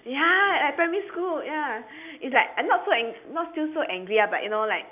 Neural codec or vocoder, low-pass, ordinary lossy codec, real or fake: none; 3.6 kHz; AAC, 32 kbps; real